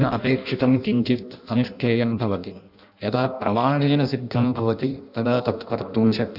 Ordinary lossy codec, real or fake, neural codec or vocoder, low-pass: none; fake; codec, 16 kHz in and 24 kHz out, 0.6 kbps, FireRedTTS-2 codec; 5.4 kHz